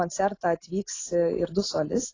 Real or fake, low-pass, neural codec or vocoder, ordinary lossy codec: real; 7.2 kHz; none; AAC, 32 kbps